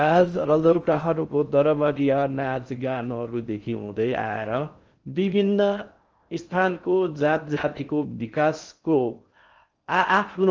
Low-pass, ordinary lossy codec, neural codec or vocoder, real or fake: 7.2 kHz; Opus, 24 kbps; codec, 16 kHz in and 24 kHz out, 0.6 kbps, FocalCodec, streaming, 4096 codes; fake